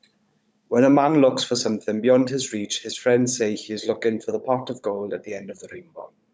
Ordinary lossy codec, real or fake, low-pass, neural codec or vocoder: none; fake; none; codec, 16 kHz, 16 kbps, FunCodec, trained on Chinese and English, 50 frames a second